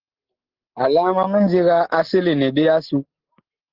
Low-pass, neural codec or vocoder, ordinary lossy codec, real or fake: 5.4 kHz; none; Opus, 16 kbps; real